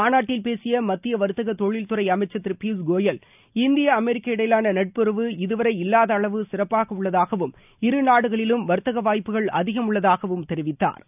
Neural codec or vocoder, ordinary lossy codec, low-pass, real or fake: none; none; 3.6 kHz; real